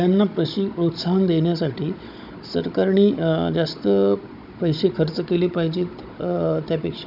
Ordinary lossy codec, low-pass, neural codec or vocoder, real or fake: none; 5.4 kHz; codec, 16 kHz, 16 kbps, FunCodec, trained on Chinese and English, 50 frames a second; fake